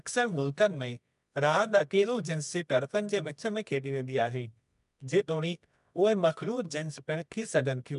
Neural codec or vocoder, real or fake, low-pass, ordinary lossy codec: codec, 24 kHz, 0.9 kbps, WavTokenizer, medium music audio release; fake; 10.8 kHz; MP3, 96 kbps